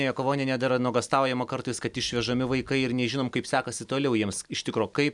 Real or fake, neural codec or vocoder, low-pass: real; none; 10.8 kHz